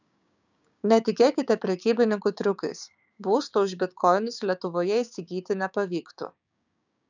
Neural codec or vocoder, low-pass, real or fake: codec, 16 kHz, 6 kbps, DAC; 7.2 kHz; fake